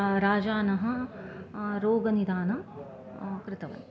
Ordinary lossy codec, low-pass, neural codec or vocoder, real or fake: none; none; none; real